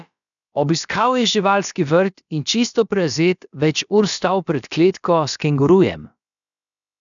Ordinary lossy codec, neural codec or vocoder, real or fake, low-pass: none; codec, 16 kHz, about 1 kbps, DyCAST, with the encoder's durations; fake; 7.2 kHz